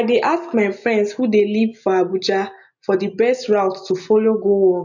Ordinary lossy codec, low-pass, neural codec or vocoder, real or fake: none; 7.2 kHz; none; real